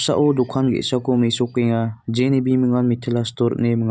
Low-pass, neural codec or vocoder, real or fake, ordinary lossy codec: none; none; real; none